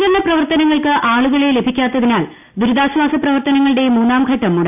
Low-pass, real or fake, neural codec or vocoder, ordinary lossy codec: 3.6 kHz; real; none; AAC, 32 kbps